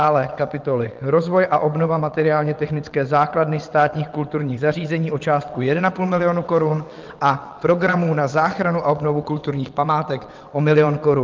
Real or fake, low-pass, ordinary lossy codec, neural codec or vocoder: fake; 7.2 kHz; Opus, 32 kbps; vocoder, 22.05 kHz, 80 mel bands, WaveNeXt